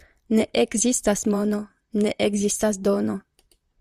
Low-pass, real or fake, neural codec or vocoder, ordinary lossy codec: 14.4 kHz; fake; vocoder, 44.1 kHz, 128 mel bands, Pupu-Vocoder; Opus, 64 kbps